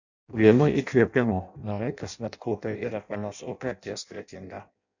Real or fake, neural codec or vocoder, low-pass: fake; codec, 16 kHz in and 24 kHz out, 0.6 kbps, FireRedTTS-2 codec; 7.2 kHz